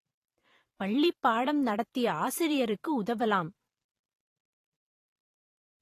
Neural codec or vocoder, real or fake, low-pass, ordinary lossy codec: vocoder, 44.1 kHz, 128 mel bands every 512 samples, BigVGAN v2; fake; 14.4 kHz; AAC, 48 kbps